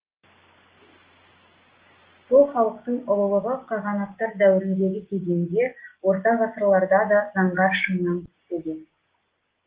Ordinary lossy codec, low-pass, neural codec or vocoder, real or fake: Opus, 16 kbps; 3.6 kHz; none; real